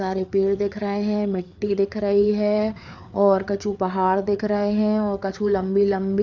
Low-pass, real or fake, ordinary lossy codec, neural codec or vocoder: 7.2 kHz; fake; none; codec, 16 kHz, 4 kbps, FreqCodec, larger model